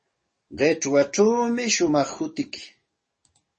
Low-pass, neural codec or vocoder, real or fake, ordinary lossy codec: 10.8 kHz; codec, 44.1 kHz, 7.8 kbps, DAC; fake; MP3, 32 kbps